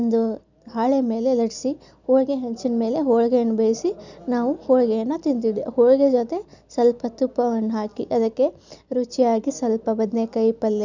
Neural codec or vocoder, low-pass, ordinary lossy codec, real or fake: none; 7.2 kHz; none; real